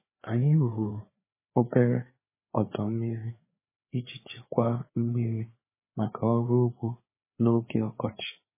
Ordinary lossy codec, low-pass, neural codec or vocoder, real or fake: MP3, 16 kbps; 3.6 kHz; codec, 16 kHz, 4 kbps, FreqCodec, larger model; fake